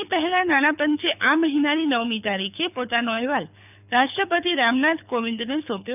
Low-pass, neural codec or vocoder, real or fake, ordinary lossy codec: 3.6 kHz; codec, 24 kHz, 6 kbps, HILCodec; fake; none